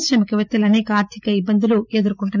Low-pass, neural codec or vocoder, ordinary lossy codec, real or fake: 7.2 kHz; none; none; real